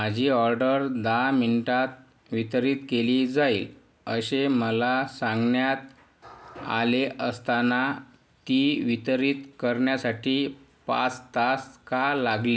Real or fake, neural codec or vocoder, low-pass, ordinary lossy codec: real; none; none; none